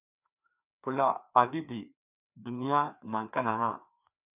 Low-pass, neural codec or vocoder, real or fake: 3.6 kHz; codec, 16 kHz, 2 kbps, FreqCodec, larger model; fake